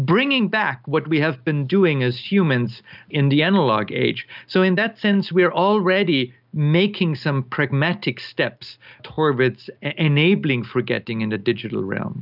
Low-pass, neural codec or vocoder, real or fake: 5.4 kHz; none; real